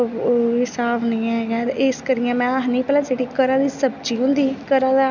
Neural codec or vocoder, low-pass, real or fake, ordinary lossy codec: none; 7.2 kHz; real; none